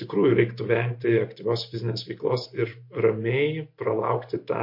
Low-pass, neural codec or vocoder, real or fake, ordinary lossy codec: 5.4 kHz; none; real; MP3, 32 kbps